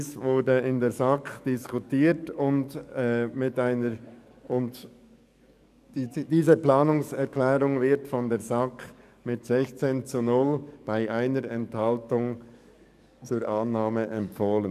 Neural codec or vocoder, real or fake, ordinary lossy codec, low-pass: codec, 44.1 kHz, 7.8 kbps, DAC; fake; none; 14.4 kHz